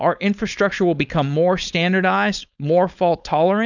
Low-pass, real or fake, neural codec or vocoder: 7.2 kHz; fake; codec, 16 kHz, 4.8 kbps, FACodec